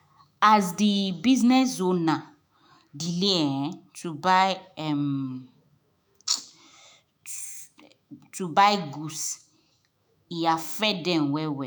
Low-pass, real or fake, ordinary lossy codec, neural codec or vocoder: none; fake; none; autoencoder, 48 kHz, 128 numbers a frame, DAC-VAE, trained on Japanese speech